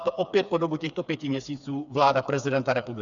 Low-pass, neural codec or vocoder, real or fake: 7.2 kHz; codec, 16 kHz, 4 kbps, FreqCodec, smaller model; fake